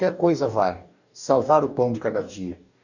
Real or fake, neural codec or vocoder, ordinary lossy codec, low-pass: fake; codec, 44.1 kHz, 2.6 kbps, DAC; none; 7.2 kHz